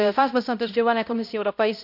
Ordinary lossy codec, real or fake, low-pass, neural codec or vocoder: none; fake; 5.4 kHz; codec, 16 kHz, 0.5 kbps, X-Codec, HuBERT features, trained on balanced general audio